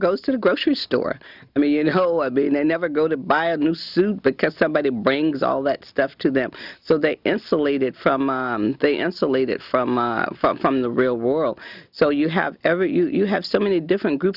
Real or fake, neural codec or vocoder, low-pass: real; none; 5.4 kHz